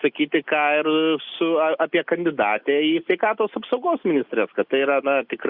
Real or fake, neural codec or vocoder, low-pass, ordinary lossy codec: real; none; 5.4 kHz; Opus, 64 kbps